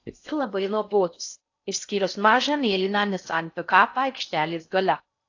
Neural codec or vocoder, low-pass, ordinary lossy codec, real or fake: codec, 16 kHz in and 24 kHz out, 0.6 kbps, FocalCodec, streaming, 4096 codes; 7.2 kHz; AAC, 48 kbps; fake